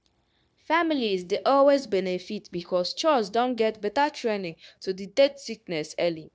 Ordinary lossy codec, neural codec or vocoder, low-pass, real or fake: none; codec, 16 kHz, 0.9 kbps, LongCat-Audio-Codec; none; fake